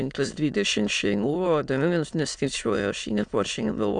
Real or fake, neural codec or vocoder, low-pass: fake; autoencoder, 22.05 kHz, a latent of 192 numbers a frame, VITS, trained on many speakers; 9.9 kHz